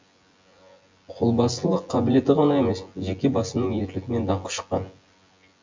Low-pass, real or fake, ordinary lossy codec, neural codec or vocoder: 7.2 kHz; fake; MP3, 64 kbps; vocoder, 24 kHz, 100 mel bands, Vocos